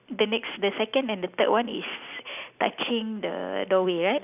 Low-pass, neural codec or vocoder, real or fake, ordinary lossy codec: 3.6 kHz; none; real; none